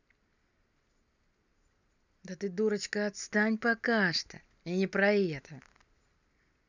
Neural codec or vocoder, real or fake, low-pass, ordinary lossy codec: none; real; 7.2 kHz; none